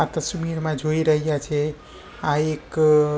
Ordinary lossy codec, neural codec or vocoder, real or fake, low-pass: none; none; real; none